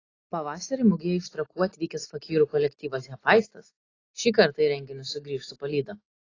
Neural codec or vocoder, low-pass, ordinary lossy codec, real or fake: none; 7.2 kHz; AAC, 32 kbps; real